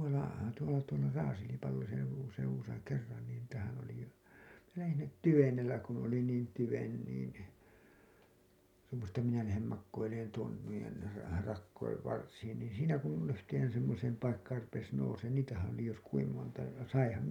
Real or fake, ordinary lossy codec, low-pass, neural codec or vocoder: real; none; 19.8 kHz; none